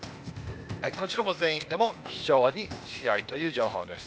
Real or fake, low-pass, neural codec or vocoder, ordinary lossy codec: fake; none; codec, 16 kHz, 0.8 kbps, ZipCodec; none